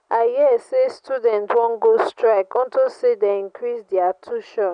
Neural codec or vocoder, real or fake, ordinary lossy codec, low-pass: none; real; none; 9.9 kHz